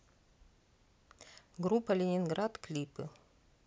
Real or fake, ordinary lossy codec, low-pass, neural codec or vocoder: real; none; none; none